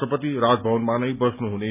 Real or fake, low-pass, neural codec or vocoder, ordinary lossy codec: real; 3.6 kHz; none; none